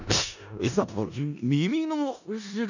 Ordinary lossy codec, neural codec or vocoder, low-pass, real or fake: none; codec, 16 kHz in and 24 kHz out, 0.4 kbps, LongCat-Audio-Codec, four codebook decoder; 7.2 kHz; fake